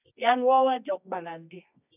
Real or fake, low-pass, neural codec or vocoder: fake; 3.6 kHz; codec, 24 kHz, 0.9 kbps, WavTokenizer, medium music audio release